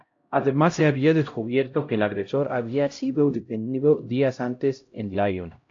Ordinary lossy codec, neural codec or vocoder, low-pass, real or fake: AAC, 48 kbps; codec, 16 kHz, 0.5 kbps, X-Codec, HuBERT features, trained on LibriSpeech; 7.2 kHz; fake